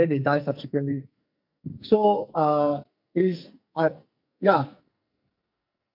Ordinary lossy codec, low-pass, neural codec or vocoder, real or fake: none; 5.4 kHz; codec, 44.1 kHz, 2.6 kbps, SNAC; fake